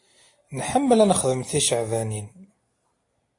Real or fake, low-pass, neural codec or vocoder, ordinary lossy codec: real; 10.8 kHz; none; AAC, 48 kbps